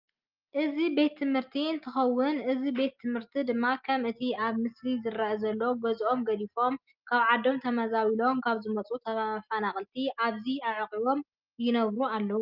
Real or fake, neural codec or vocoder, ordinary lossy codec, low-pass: real; none; Opus, 32 kbps; 5.4 kHz